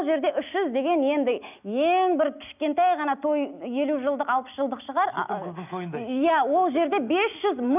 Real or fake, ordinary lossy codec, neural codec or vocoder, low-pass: real; none; none; 3.6 kHz